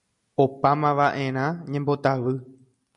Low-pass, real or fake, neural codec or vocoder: 10.8 kHz; real; none